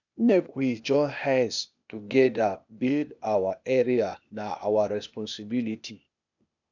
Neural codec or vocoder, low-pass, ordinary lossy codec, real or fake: codec, 16 kHz, 0.8 kbps, ZipCodec; 7.2 kHz; none; fake